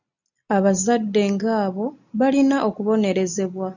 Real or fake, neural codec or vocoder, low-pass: real; none; 7.2 kHz